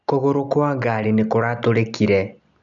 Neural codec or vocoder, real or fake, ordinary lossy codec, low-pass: none; real; none; 7.2 kHz